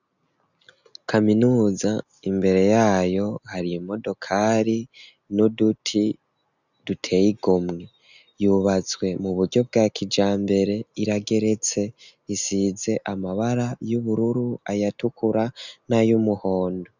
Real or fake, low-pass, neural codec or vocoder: real; 7.2 kHz; none